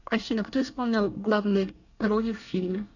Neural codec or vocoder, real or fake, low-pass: codec, 24 kHz, 1 kbps, SNAC; fake; 7.2 kHz